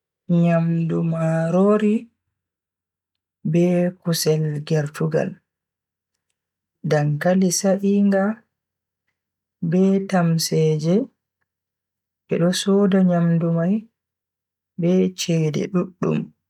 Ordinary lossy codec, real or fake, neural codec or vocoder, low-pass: none; real; none; 19.8 kHz